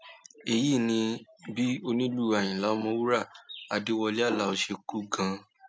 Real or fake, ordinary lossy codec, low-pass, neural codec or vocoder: real; none; none; none